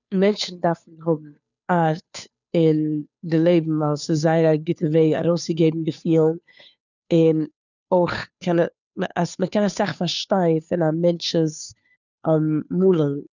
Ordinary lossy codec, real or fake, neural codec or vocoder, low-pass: none; fake; codec, 16 kHz, 2 kbps, FunCodec, trained on Chinese and English, 25 frames a second; 7.2 kHz